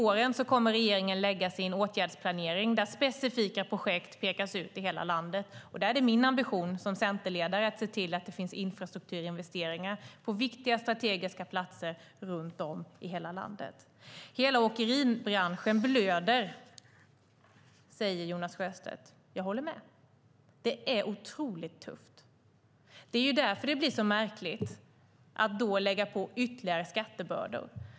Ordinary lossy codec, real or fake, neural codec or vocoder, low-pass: none; real; none; none